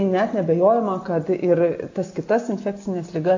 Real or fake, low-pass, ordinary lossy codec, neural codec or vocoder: real; 7.2 kHz; AAC, 48 kbps; none